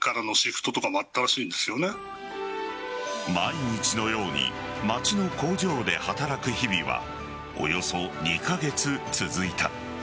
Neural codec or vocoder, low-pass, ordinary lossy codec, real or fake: none; none; none; real